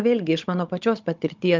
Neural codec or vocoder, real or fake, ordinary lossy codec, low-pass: codec, 16 kHz, 16 kbps, FunCodec, trained on Chinese and English, 50 frames a second; fake; Opus, 32 kbps; 7.2 kHz